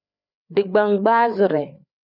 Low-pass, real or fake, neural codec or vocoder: 5.4 kHz; fake; codec, 16 kHz, 4 kbps, FreqCodec, larger model